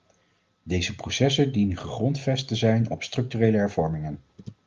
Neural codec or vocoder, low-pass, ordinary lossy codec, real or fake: none; 7.2 kHz; Opus, 32 kbps; real